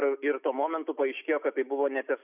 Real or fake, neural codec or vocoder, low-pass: real; none; 3.6 kHz